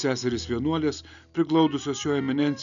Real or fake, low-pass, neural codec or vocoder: real; 7.2 kHz; none